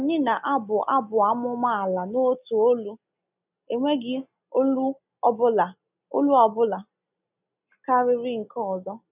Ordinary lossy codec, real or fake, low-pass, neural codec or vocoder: none; real; 3.6 kHz; none